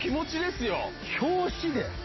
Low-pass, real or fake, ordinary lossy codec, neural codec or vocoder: 7.2 kHz; real; MP3, 24 kbps; none